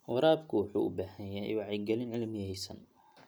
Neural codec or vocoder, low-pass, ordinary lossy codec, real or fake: none; none; none; real